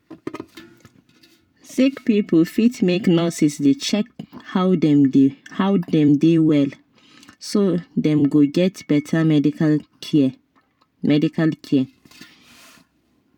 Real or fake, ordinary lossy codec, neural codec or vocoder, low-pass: fake; none; vocoder, 44.1 kHz, 128 mel bands every 256 samples, BigVGAN v2; 19.8 kHz